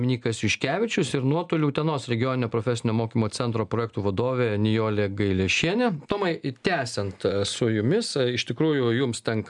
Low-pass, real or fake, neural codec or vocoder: 10.8 kHz; real; none